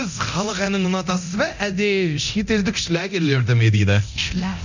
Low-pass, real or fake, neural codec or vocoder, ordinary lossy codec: 7.2 kHz; fake; codec, 24 kHz, 0.9 kbps, DualCodec; none